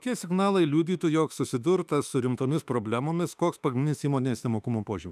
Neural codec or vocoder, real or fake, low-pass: autoencoder, 48 kHz, 32 numbers a frame, DAC-VAE, trained on Japanese speech; fake; 14.4 kHz